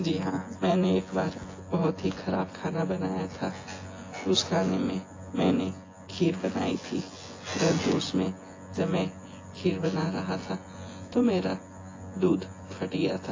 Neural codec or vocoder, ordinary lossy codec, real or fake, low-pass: vocoder, 24 kHz, 100 mel bands, Vocos; AAC, 32 kbps; fake; 7.2 kHz